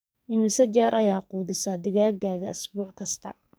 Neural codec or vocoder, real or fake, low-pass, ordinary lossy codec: codec, 44.1 kHz, 2.6 kbps, SNAC; fake; none; none